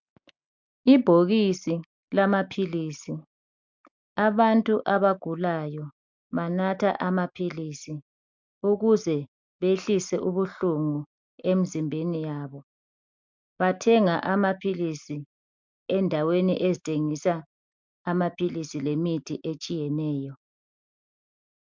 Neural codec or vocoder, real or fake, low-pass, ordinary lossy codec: none; real; 7.2 kHz; MP3, 64 kbps